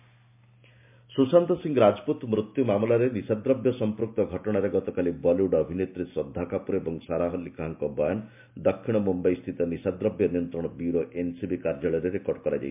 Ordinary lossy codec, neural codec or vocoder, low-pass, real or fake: MP3, 32 kbps; none; 3.6 kHz; real